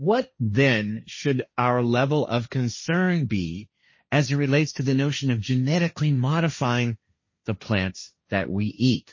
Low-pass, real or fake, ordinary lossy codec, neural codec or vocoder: 7.2 kHz; fake; MP3, 32 kbps; codec, 16 kHz, 1.1 kbps, Voila-Tokenizer